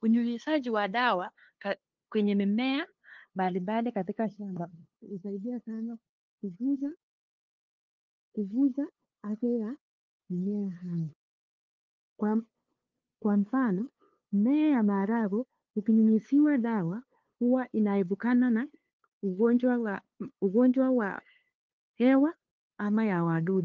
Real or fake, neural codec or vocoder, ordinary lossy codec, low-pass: fake; codec, 16 kHz, 2 kbps, FunCodec, trained on LibriTTS, 25 frames a second; Opus, 32 kbps; 7.2 kHz